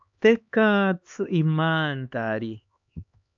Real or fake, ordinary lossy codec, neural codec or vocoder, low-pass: fake; AAC, 64 kbps; codec, 16 kHz, 2 kbps, X-Codec, HuBERT features, trained on LibriSpeech; 7.2 kHz